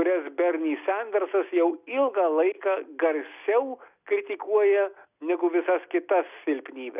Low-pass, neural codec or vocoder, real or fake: 3.6 kHz; none; real